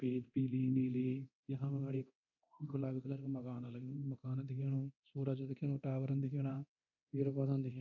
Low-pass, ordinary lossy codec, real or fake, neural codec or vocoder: 7.2 kHz; none; fake; codec, 24 kHz, 0.9 kbps, DualCodec